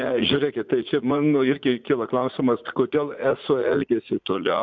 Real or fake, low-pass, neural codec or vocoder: fake; 7.2 kHz; vocoder, 44.1 kHz, 80 mel bands, Vocos